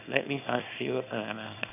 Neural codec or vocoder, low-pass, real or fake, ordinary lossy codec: codec, 24 kHz, 0.9 kbps, WavTokenizer, small release; 3.6 kHz; fake; none